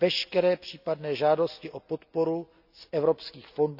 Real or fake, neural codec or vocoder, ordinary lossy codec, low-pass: real; none; none; 5.4 kHz